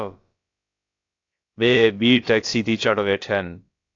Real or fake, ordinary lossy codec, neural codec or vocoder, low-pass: fake; AAC, 48 kbps; codec, 16 kHz, about 1 kbps, DyCAST, with the encoder's durations; 7.2 kHz